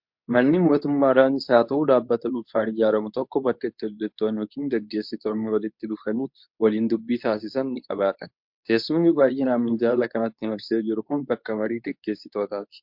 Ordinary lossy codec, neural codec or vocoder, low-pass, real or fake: MP3, 48 kbps; codec, 24 kHz, 0.9 kbps, WavTokenizer, medium speech release version 2; 5.4 kHz; fake